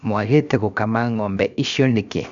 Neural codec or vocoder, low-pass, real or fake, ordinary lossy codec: codec, 16 kHz, 0.7 kbps, FocalCodec; 7.2 kHz; fake; Opus, 64 kbps